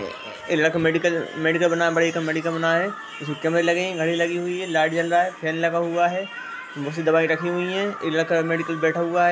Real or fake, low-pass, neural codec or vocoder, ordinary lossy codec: real; none; none; none